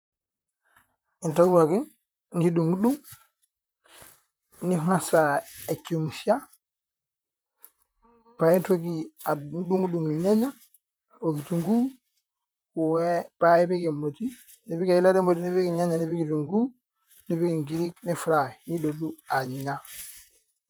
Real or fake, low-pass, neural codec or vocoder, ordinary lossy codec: fake; none; vocoder, 44.1 kHz, 128 mel bands, Pupu-Vocoder; none